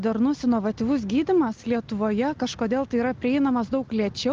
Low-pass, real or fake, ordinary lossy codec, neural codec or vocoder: 7.2 kHz; real; Opus, 16 kbps; none